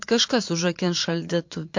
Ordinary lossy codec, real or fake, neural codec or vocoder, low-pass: MP3, 48 kbps; fake; vocoder, 22.05 kHz, 80 mel bands, Vocos; 7.2 kHz